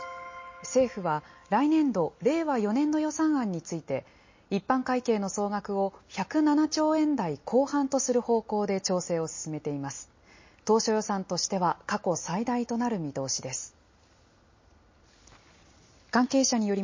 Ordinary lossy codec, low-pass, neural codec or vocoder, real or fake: MP3, 32 kbps; 7.2 kHz; none; real